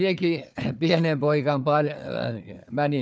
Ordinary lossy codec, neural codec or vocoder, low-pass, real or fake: none; codec, 16 kHz, 4 kbps, FunCodec, trained on Chinese and English, 50 frames a second; none; fake